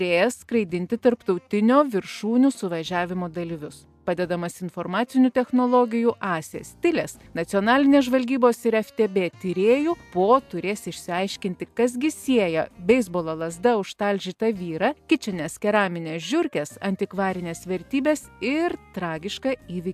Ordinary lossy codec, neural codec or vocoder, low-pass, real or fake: AAC, 96 kbps; none; 14.4 kHz; real